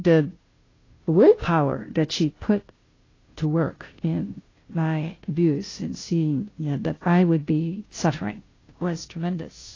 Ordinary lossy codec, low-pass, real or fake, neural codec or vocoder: AAC, 32 kbps; 7.2 kHz; fake; codec, 16 kHz, 0.5 kbps, FunCodec, trained on Chinese and English, 25 frames a second